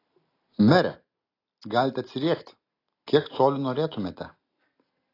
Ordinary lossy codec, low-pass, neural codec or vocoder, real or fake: AAC, 32 kbps; 5.4 kHz; none; real